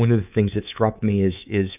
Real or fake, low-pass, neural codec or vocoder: fake; 3.6 kHz; codec, 24 kHz, 3.1 kbps, DualCodec